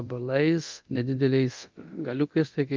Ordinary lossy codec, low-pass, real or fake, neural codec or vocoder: Opus, 32 kbps; 7.2 kHz; fake; codec, 24 kHz, 0.9 kbps, DualCodec